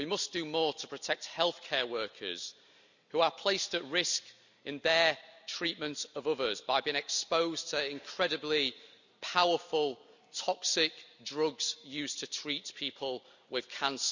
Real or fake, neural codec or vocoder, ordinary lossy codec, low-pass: real; none; none; 7.2 kHz